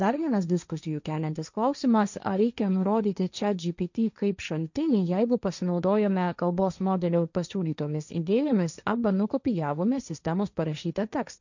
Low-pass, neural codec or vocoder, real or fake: 7.2 kHz; codec, 16 kHz, 1.1 kbps, Voila-Tokenizer; fake